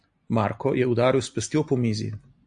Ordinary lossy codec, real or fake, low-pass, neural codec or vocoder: AAC, 64 kbps; real; 9.9 kHz; none